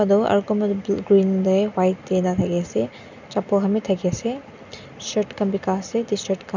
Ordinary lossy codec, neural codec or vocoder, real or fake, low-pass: none; none; real; 7.2 kHz